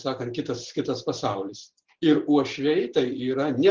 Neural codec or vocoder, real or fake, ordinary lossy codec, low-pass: none; real; Opus, 16 kbps; 7.2 kHz